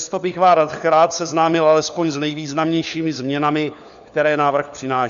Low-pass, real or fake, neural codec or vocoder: 7.2 kHz; fake; codec, 16 kHz, 4 kbps, FunCodec, trained on LibriTTS, 50 frames a second